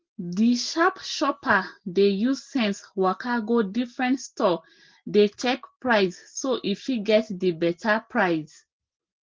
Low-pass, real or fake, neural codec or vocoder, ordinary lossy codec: 7.2 kHz; real; none; Opus, 16 kbps